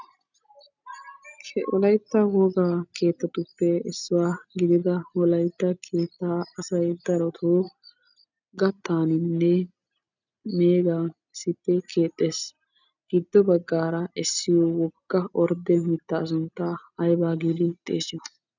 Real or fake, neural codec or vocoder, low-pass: real; none; 7.2 kHz